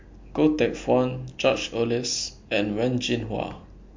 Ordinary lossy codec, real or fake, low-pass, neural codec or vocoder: MP3, 48 kbps; real; 7.2 kHz; none